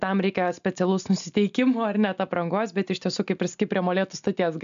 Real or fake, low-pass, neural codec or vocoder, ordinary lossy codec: real; 7.2 kHz; none; AAC, 96 kbps